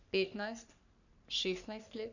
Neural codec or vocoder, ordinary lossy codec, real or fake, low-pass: codec, 44.1 kHz, 3.4 kbps, Pupu-Codec; none; fake; 7.2 kHz